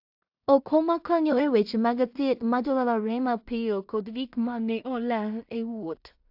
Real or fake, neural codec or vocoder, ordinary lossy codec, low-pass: fake; codec, 16 kHz in and 24 kHz out, 0.4 kbps, LongCat-Audio-Codec, two codebook decoder; AAC, 48 kbps; 5.4 kHz